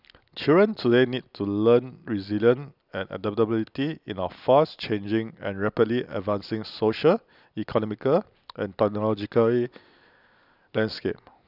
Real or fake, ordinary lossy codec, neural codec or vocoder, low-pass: real; none; none; 5.4 kHz